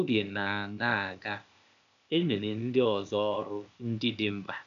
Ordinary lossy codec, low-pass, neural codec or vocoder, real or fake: none; 7.2 kHz; codec, 16 kHz, 0.8 kbps, ZipCodec; fake